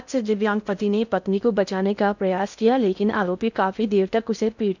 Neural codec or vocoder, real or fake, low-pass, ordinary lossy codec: codec, 16 kHz in and 24 kHz out, 0.6 kbps, FocalCodec, streaming, 2048 codes; fake; 7.2 kHz; none